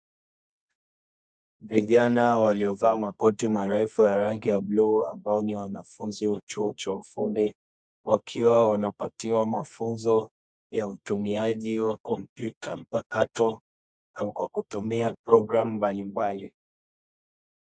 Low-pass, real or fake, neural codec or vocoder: 9.9 kHz; fake; codec, 24 kHz, 0.9 kbps, WavTokenizer, medium music audio release